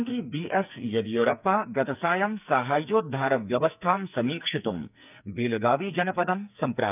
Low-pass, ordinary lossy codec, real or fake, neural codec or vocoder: 3.6 kHz; none; fake; codec, 44.1 kHz, 2.6 kbps, SNAC